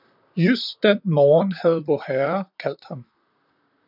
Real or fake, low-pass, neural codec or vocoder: fake; 5.4 kHz; vocoder, 44.1 kHz, 128 mel bands, Pupu-Vocoder